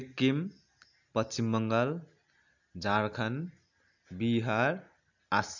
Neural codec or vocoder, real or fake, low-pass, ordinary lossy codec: none; real; 7.2 kHz; none